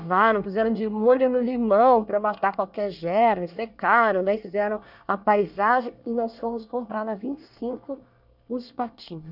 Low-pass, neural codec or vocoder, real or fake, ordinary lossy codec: 5.4 kHz; codec, 24 kHz, 1 kbps, SNAC; fake; none